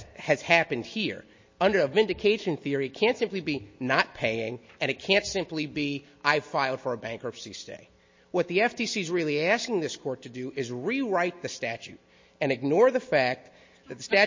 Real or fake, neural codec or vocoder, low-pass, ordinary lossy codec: real; none; 7.2 kHz; MP3, 32 kbps